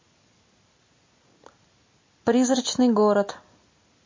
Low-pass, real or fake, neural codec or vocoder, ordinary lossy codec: 7.2 kHz; real; none; MP3, 32 kbps